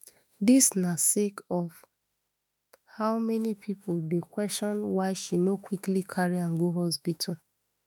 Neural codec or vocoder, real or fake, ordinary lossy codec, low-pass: autoencoder, 48 kHz, 32 numbers a frame, DAC-VAE, trained on Japanese speech; fake; none; none